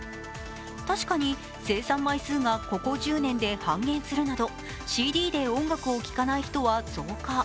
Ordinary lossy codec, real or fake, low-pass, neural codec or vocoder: none; real; none; none